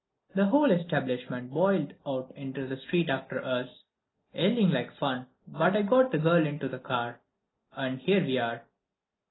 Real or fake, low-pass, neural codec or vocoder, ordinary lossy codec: real; 7.2 kHz; none; AAC, 16 kbps